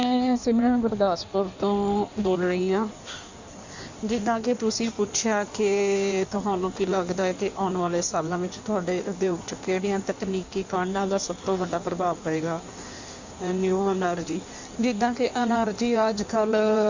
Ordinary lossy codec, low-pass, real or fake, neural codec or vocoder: Opus, 64 kbps; 7.2 kHz; fake; codec, 16 kHz in and 24 kHz out, 1.1 kbps, FireRedTTS-2 codec